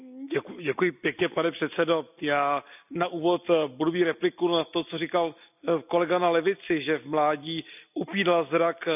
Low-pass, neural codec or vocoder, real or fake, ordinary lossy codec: 3.6 kHz; none; real; none